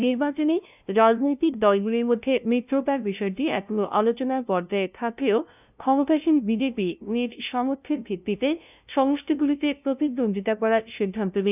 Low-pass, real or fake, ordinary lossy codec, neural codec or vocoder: 3.6 kHz; fake; none; codec, 16 kHz, 0.5 kbps, FunCodec, trained on LibriTTS, 25 frames a second